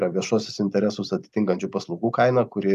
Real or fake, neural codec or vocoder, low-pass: real; none; 14.4 kHz